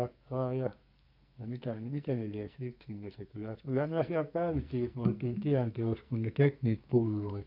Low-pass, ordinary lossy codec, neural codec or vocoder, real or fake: 5.4 kHz; none; codec, 44.1 kHz, 2.6 kbps, SNAC; fake